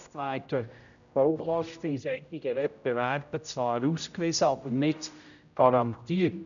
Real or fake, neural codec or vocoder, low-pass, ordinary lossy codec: fake; codec, 16 kHz, 0.5 kbps, X-Codec, HuBERT features, trained on general audio; 7.2 kHz; none